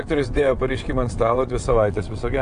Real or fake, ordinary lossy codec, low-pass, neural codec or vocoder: fake; AAC, 64 kbps; 9.9 kHz; vocoder, 22.05 kHz, 80 mel bands, WaveNeXt